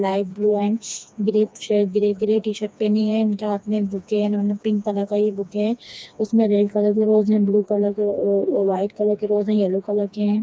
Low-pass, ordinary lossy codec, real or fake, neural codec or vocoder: none; none; fake; codec, 16 kHz, 2 kbps, FreqCodec, smaller model